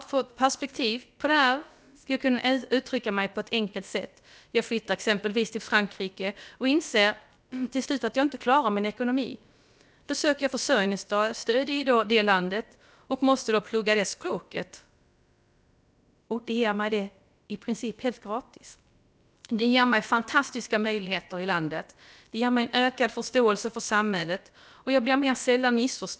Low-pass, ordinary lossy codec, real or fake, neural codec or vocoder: none; none; fake; codec, 16 kHz, about 1 kbps, DyCAST, with the encoder's durations